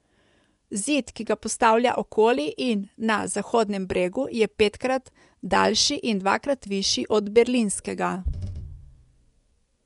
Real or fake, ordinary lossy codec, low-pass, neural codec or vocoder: fake; none; 10.8 kHz; vocoder, 24 kHz, 100 mel bands, Vocos